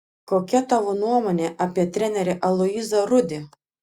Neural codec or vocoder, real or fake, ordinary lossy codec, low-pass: none; real; Opus, 64 kbps; 19.8 kHz